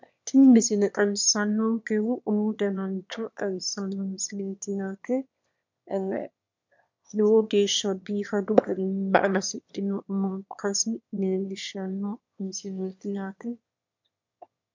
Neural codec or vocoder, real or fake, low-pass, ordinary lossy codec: autoencoder, 22.05 kHz, a latent of 192 numbers a frame, VITS, trained on one speaker; fake; 7.2 kHz; MP3, 64 kbps